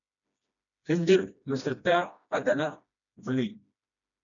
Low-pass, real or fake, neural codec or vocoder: 7.2 kHz; fake; codec, 16 kHz, 1 kbps, FreqCodec, smaller model